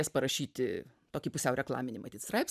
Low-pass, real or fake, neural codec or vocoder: 14.4 kHz; fake; vocoder, 44.1 kHz, 128 mel bands every 512 samples, BigVGAN v2